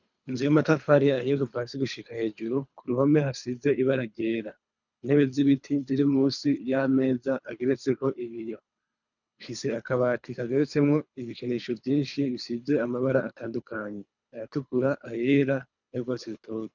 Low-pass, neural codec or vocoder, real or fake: 7.2 kHz; codec, 24 kHz, 3 kbps, HILCodec; fake